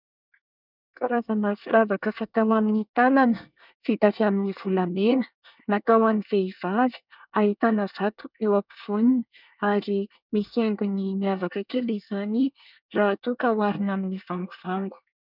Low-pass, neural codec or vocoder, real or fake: 5.4 kHz; codec, 24 kHz, 1 kbps, SNAC; fake